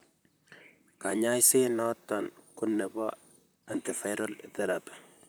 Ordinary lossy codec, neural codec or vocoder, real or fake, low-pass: none; vocoder, 44.1 kHz, 128 mel bands, Pupu-Vocoder; fake; none